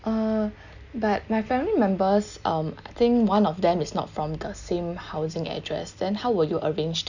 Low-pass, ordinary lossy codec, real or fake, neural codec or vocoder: 7.2 kHz; none; real; none